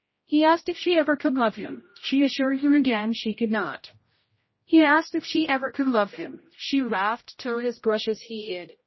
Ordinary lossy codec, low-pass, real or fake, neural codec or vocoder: MP3, 24 kbps; 7.2 kHz; fake; codec, 16 kHz, 0.5 kbps, X-Codec, HuBERT features, trained on general audio